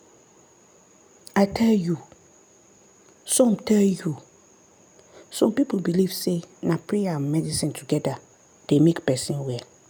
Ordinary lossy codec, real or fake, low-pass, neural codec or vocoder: none; real; none; none